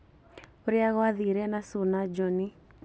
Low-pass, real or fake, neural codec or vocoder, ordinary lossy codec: none; real; none; none